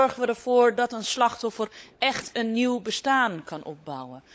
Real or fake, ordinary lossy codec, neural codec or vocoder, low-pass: fake; none; codec, 16 kHz, 16 kbps, FunCodec, trained on LibriTTS, 50 frames a second; none